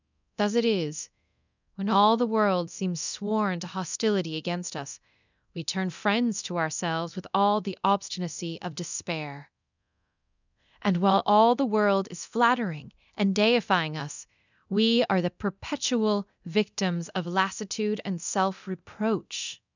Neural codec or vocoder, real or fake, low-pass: codec, 24 kHz, 0.9 kbps, DualCodec; fake; 7.2 kHz